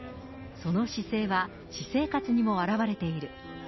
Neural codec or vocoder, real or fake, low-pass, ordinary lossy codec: none; real; 7.2 kHz; MP3, 24 kbps